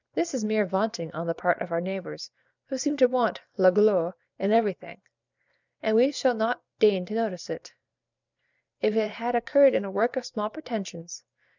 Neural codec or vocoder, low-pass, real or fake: vocoder, 44.1 kHz, 128 mel bands every 512 samples, BigVGAN v2; 7.2 kHz; fake